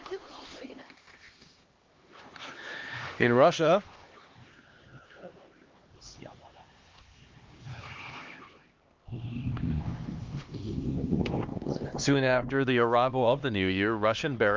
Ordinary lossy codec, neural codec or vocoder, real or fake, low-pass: Opus, 32 kbps; codec, 16 kHz, 2 kbps, X-Codec, HuBERT features, trained on LibriSpeech; fake; 7.2 kHz